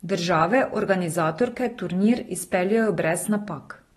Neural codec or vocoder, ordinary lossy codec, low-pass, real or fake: none; AAC, 32 kbps; 19.8 kHz; real